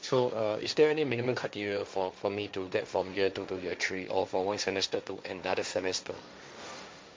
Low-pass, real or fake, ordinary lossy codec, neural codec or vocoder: none; fake; none; codec, 16 kHz, 1.1 kbps, Voila-Tokenizer